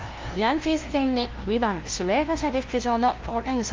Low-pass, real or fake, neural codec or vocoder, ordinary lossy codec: 7.2 kHz; fake; codec, 16 kHz, 0.5 kbps, FunCodec, trained on LibriTTS, 25 frames a second; Opus, 32 kbps